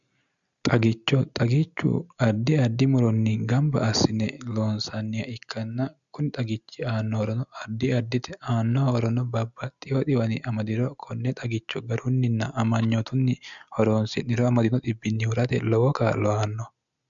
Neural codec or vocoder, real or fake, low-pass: none; real; 7.2 kHz